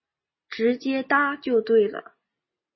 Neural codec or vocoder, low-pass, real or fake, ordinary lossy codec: none; 7.2 kHz; real; MP3, 24 kbps